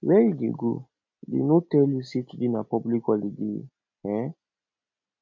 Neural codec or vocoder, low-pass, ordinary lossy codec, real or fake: none; 7.2 kHz; MP3, 48 kbps; real